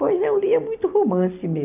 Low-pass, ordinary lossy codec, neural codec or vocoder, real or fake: 3.6 kHz; AAC, 32 kbps; none; real